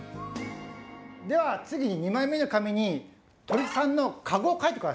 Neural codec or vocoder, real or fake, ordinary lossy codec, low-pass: none; real; none; none